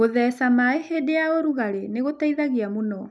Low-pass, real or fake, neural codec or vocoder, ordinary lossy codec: none; real; none; none